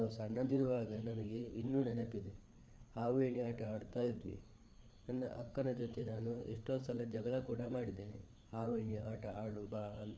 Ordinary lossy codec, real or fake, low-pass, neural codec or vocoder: none; fake; none; codec, 16 kHz, 4 kbps, FreqCodec, larger model